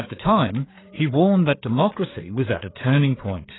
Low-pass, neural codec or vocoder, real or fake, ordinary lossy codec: 7.2 kHz; codec, 16 kHz, 4 kbps, FreqCodec, larger model; fake; AAC, 16 kbps